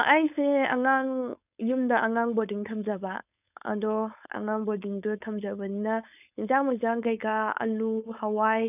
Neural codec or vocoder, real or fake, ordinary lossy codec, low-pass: codec, 16 kHz, 4.8 kbps, FACodec; fake; none; 3.6 kHz